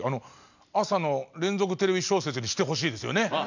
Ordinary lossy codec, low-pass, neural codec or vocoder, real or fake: none; 7.2 kHz; none; real